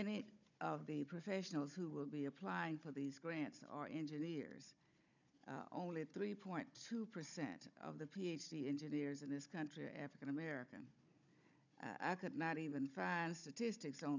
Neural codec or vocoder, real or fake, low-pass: codec, 16 kHz, 16 kbps, FunCodec, trained on Chinese and English, 50 frames a second; fake; 7.2 kHz